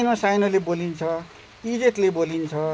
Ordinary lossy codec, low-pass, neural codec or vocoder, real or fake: none; none; none; real